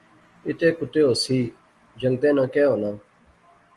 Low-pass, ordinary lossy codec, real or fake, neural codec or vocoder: 10.8 kHz; Opus, 24 kbps; real; none